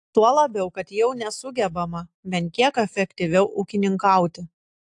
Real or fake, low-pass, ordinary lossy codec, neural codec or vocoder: real; 10.8 kHz; AAC, 64 kbps; none